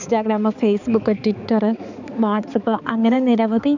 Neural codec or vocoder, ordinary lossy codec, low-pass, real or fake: codec, 16 kHz, 4 kbps, X-Codec, HuBERT features, trained on balanced general audio; none; 7.2 kHz; fake